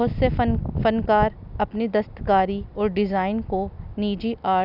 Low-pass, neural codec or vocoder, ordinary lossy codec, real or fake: 5.4 kHz; none; none; real